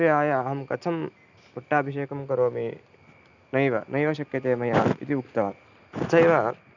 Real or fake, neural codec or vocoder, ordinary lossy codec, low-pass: real; none; none; 7.2 kHz